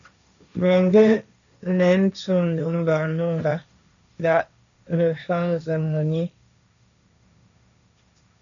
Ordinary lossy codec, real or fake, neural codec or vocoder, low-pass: MP3, 96 kbps; fake; codec, 16 kHz, 1.1 kbps, Voila-Tokenizer; 7.2 kHz